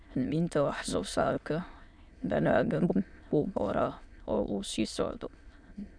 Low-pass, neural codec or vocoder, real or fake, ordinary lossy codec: 9.9 kHz; autoencoder, 22.05 kHz, a latent of 192 numbers a frame, VITS, trained on many speakers; fake; MP3, 96 kbps